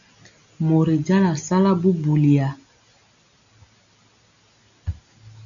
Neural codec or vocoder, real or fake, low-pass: none; real; 7.2 kHz